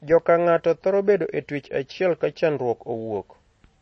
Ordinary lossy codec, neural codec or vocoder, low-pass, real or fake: MP3, 32 kbps; none; 9.9 kHz; real